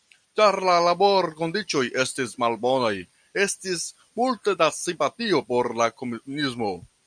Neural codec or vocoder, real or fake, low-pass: none; real; 9.9 kHz